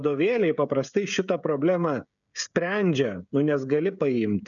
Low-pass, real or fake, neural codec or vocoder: 7.2 kHz; fake; codec, 16 kHz, 16 kbps, FreqCodec, smaller model